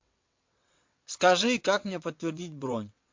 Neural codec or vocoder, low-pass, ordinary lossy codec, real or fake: vocoder, 22.05 kHz, 80 mel bands, WaveNeXt; 7.2 kHz; MP3, 48 kbps; fake